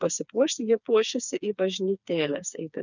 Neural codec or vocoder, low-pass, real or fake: codec, 16 kHz, 4 kbps, FreqCodec, smaller model; 7.2 kHz; fake